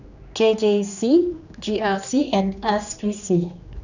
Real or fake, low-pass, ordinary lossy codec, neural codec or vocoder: fake; 7.2 kHz; none; codec, 16 kHz, 2 kbps, X-Codec, HuBERT features, trained on general audio